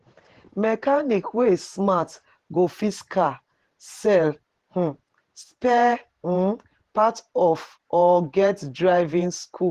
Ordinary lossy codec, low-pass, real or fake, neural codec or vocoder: Opus, 16 kbps; 14.4 kHz; fake; vocoder, 48 kHz, 128 mel bands, Vocos